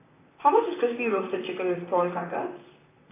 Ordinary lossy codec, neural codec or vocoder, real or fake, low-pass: AAC, 16 kbps; vocoder, 44.1 kHz, 128 mel bands, Pupu-Vocoder; fake; 3.6 kHz